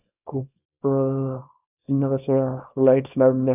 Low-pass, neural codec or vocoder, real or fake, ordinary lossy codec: 3.6 kHz; codec, 24 kHz, 0.9 kbps, WavTokenizer, small release; fake; none